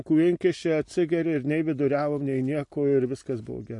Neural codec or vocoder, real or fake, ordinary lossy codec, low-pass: none; real; MP3, 48 kbps; 10.8 kHz